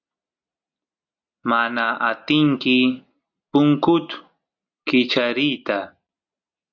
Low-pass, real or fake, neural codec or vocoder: 7.2 kHz; real; none